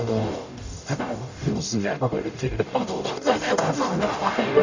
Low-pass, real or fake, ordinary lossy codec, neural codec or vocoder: 7.2 kHz; fake; Opus, 64 kbps; codec, 44.1 kHz, 0.9 kbps, DAC